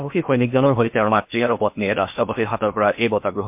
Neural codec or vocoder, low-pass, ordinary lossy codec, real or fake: codec, 16 kHz in and 24 kHz out, 0.8 kbps, FocalCodec, streaming, 65536 codes; 3.6 kHz; MP3, 32 kbps; fake